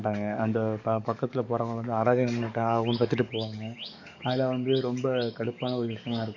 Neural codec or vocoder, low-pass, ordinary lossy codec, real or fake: codec, 44.1 kHz, 7.8 kbps, DAC; 7.2 kHz; none; fake